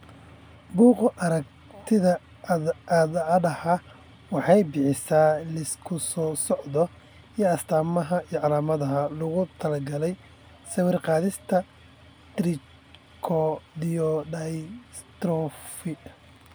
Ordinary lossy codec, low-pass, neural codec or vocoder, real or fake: none; none; none; real